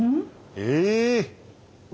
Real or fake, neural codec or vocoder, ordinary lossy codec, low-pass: real; none; none; none